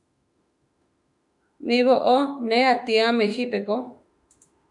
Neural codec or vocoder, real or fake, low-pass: autoencoder, 48 kHz, 32 numbers a frame, DAC-VAE, trained on Japanese speech; fake; 10.8 kHz